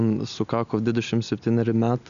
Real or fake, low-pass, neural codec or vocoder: real; 7.2 kHz; none